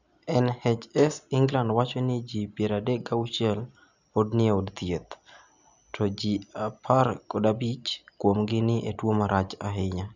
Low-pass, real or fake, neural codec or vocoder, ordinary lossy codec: 7.2 kHz; real; none; none